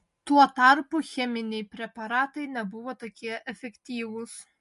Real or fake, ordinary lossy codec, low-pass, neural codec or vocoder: real; MP3, 48 kbps; 14.4 kHz; none